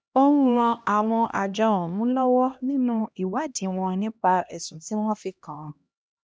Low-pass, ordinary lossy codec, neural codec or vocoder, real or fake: none; none; codec, 16 kHz, 1 kbps, X-Codec, HuBERT features, trained on LibriSpeech; fake